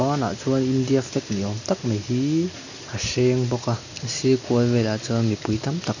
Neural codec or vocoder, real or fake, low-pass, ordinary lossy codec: none; real; 7.2 kHz; none